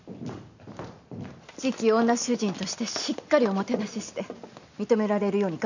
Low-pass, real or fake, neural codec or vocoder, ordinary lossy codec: 7.2 kHz; real; none; none